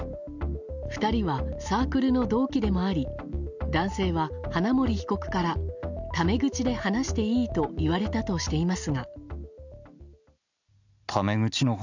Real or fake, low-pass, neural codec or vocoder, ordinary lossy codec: real; 7.2 kHz; none; none